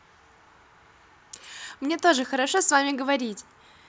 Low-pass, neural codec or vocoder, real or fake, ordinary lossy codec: none; none; real; none